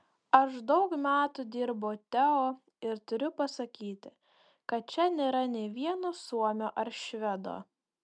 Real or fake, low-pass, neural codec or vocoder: real; 9.9 kHz; none